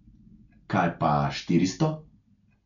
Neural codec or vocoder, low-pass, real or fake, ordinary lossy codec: none; 7.2 kHz; real; none